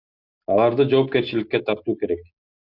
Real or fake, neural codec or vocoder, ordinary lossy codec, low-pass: real; none; Opus, 64 kbps; 5.4 kHz